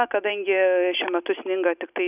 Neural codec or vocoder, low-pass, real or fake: none; 3.6 kHz; real